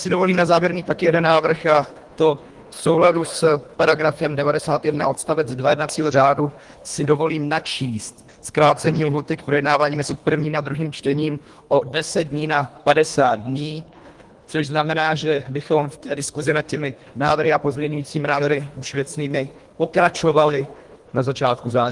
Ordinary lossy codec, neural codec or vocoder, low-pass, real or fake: Opus, 24 kbps; codec, 24 kHz, 1.5 kbps, HILCodec; 10.8 kHz; fake